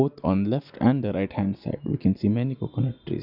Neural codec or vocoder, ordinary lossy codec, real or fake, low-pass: none; none; real; 5.4 kHz